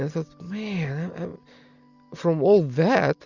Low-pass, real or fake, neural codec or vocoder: 7.2 kHz; real; none